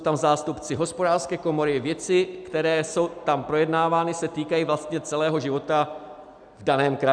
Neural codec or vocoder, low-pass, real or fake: none; 9.9 kHz; real